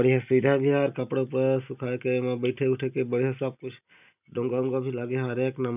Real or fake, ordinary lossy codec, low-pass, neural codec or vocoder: real; none; 3.6 kHz; none